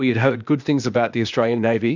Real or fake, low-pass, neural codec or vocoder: fake; 7.2 kHz; codec, 16 kHz, 0.8 kbps, ZipCodec